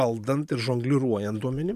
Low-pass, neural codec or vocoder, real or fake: 14.4 kHz; vocoder, 44.1 kHz, 128 mel bands every 512 samples, BigVGAN v2; fake